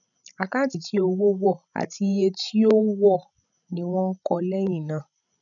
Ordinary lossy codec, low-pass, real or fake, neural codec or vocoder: none; 7.2 kHz; fake; codec, 16 kHz, 16 kbps, FreqCodec, larger model